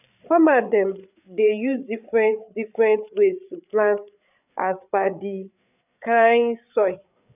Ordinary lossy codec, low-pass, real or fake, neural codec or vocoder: none; 3.6 kHz; fake; codec, 16 kHz, 8 kbps, FreqCodec, larger model